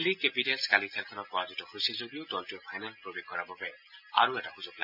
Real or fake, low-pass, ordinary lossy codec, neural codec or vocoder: real; 5.4 kHz; AAC, 48 kbps; none